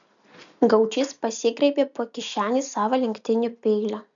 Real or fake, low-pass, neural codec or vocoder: real; 7.2 kHz; none